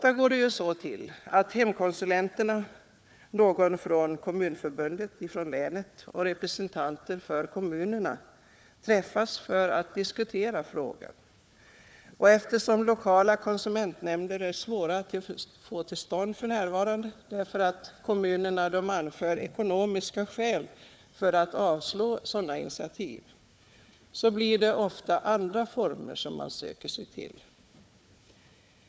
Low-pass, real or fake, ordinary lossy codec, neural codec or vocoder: none; fake; none; codec, 16 kHz, 4 kbps, FunCodec, trained on Chinese and English, 50 frames a second